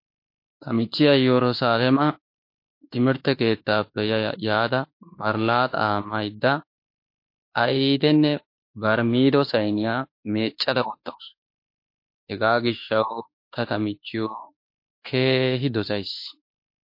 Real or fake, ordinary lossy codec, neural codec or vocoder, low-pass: fake; MP3, 32 kbps; autoencoder, 48 kHz, 32 numbers a frame, DAC-VAE, trained on Japanese speech; 5.4 kHz